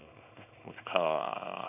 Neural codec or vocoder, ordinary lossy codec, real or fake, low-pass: codec, 24 kHz, 0.9 kbps, WavTokenizer, small release; none; fake; 3.6 kHz